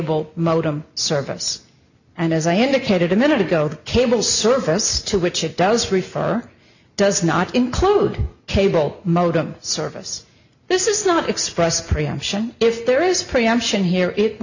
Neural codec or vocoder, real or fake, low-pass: none; real; 7.2 kHz